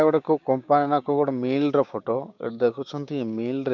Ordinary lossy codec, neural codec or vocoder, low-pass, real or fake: none; codec, 16 kHz, 6 kbps, DAC; 7.2 kHz; fake